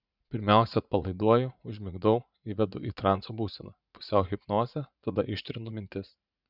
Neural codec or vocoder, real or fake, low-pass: vocoder, 44.1 kHz, 80 mel bands, Vocos; fake; 5.4 kHz